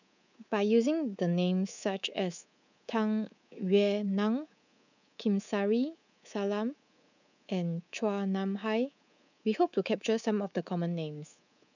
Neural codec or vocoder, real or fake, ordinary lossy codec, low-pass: codec, 24 kHz, 3.1 kbps, DualCodec; fake; none; 7.2 kHz